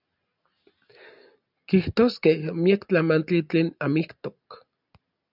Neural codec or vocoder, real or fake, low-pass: none; real; 5.4 kHz